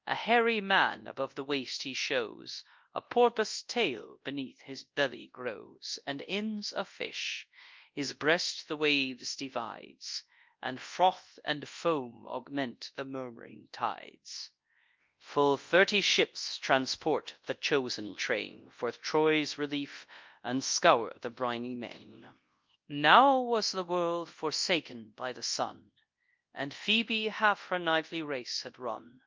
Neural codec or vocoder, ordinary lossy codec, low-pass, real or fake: codec, 24 kHz, 0.9 kbps, WavTokenizer, large speech release; Opus, 24 kbps; 7.2 kHz; fake